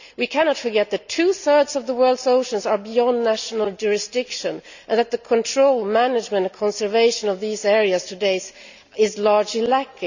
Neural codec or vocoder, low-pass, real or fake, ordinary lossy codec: none; 7.2 kHz; real; none